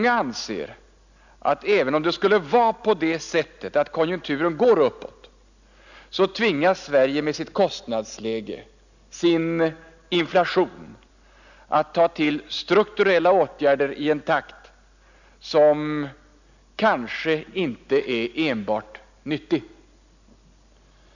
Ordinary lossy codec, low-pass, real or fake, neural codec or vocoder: none; 7.2 kHz; real; none